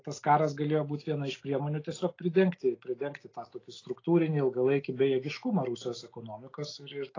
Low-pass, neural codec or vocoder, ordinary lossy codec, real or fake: 7.2 kHz; none; AAC, 32 kbps; real